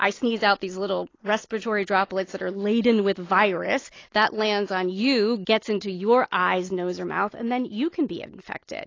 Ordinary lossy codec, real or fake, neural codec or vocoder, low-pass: AAC, 32 kbps; real; none; 7.2 kHz